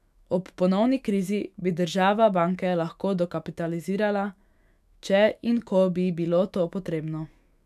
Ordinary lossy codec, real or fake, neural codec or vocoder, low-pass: none; fake; autoencoder, 48 kHz, 128 numbers a frame, DAC-VAE, trained on Japanese speech; 14.4 kHz